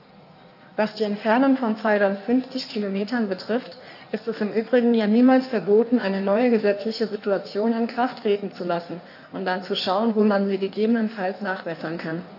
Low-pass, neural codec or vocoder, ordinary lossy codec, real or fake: 5.4 kHz; codec, 16 kHz in and 24 kHz out, 1.1 kbps, FireRedTTS-2 codec; AAC, 48 kbps; fake